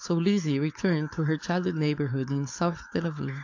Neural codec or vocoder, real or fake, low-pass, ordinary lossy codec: codec, 16 kHz, 4.8 kbps, FACodec; fake; 7.2 kHz; AAC, 48 kbps